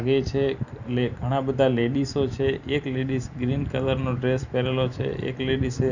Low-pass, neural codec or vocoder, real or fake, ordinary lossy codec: 7.2 kHz; vocoder, 44.1 kHz, 128 mel bands every 512 samples, BigVGAN v2; fake; none